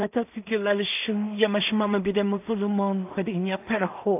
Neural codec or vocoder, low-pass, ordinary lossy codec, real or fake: codec, 16 kHz in and 24 kHz out, 0.4 kbps, LongCat-Audio-Codec, two codebook decoder; 3.6 kHz; AAC, 32 kbps; fake